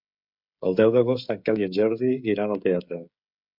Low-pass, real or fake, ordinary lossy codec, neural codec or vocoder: 5.4 kHz; fake; MP3, 48 kbps; codec, 16 kHz, 16 kbps, FreqCodec, smaller model